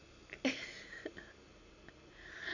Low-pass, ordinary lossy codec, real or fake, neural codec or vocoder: 7.2 kHz; none; real; none